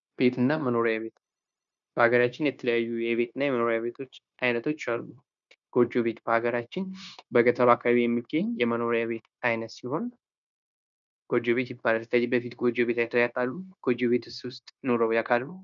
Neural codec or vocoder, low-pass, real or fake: codec, 16 kHz, 0.9 kbps, LongCat-Audio-Codec; 7.2 kHz; fake